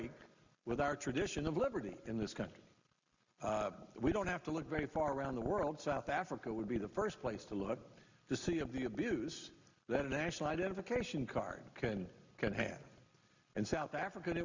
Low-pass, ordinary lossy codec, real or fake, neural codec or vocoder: 7.2 kHz; Opus, 64 kbps; real; none